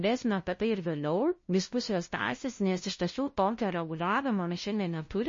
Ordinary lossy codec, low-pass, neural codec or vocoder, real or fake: MP3, 32 kbps; 7.2 kHz; codec, 16 kHz, 0.5 kbps, FunCodec, trained on LibriTTS, 25 frames a second; fake